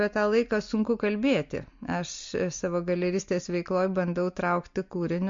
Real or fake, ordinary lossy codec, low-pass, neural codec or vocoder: real; MP3, 48 kbps; 7.2 kHz; none